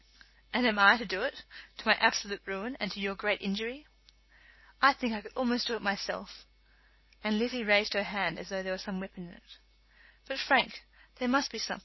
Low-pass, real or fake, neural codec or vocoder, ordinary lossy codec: 7.2 kHz; real; none; MP3, 24 kbps